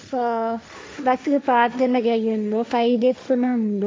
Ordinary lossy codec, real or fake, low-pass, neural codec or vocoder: none; fake; none; codec, 16 kHz, 1.1 kbps, Voila-Tokenizer